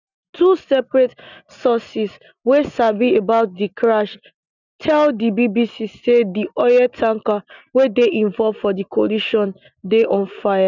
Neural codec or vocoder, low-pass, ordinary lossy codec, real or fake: none; 7.2 kHz; none; real